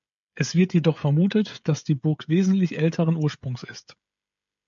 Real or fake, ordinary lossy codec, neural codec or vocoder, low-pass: fake; MP3, 64 kbps; codec, 16 kHz, 16 kbps, FreqCodec, smaller model; 7.2 kHz